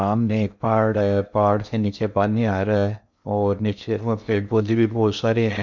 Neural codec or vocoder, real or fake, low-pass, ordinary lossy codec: codec, 16 kHz in and 24 kHz out, 0.6 kbps, FocalCodec, streaming, 2048 codes; fake; 7.2 kHz; none